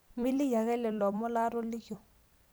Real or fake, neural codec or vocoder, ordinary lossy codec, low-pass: fake; vocoder, 44.1 kHz, 128 mel bands every 256 samples, BigVGAN v2; none; none